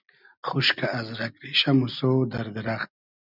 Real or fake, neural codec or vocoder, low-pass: real; none; 5.4 kHz